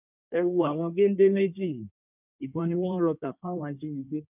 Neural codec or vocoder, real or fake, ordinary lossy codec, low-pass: codec, 16 kHz in and 24 kHz out, 1.1 kbps, FireRedTTS-2 codec; fake; none; 3.6 kHz